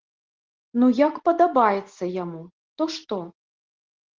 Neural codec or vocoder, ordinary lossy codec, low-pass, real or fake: none; Opus, 16 kbps; 7.2 kHz; real